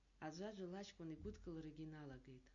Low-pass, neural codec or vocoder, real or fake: 7.2 kHz; none; real